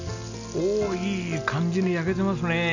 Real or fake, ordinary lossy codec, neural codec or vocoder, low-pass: real; none; none; 7.2 kHz